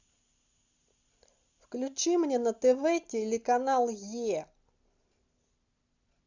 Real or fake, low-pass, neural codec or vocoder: real; 7.2 kHz; none